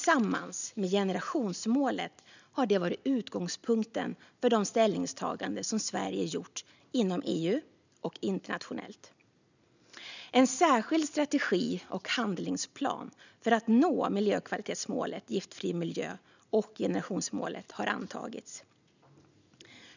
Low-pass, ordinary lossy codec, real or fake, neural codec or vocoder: 7.2 kHz; none; real; none